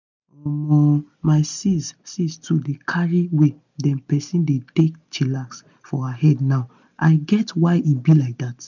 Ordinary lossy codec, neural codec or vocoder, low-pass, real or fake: none; none; 7.2 kHz; real